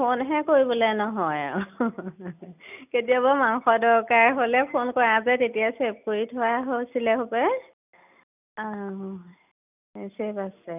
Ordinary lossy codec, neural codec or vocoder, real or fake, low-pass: none; none; real; 3.6 kHz